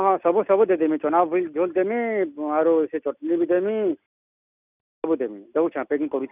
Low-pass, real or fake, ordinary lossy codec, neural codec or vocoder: 3.6 kHz; real; none; none